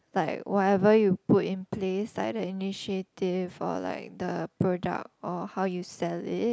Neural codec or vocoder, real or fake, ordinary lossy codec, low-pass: none; real; none; none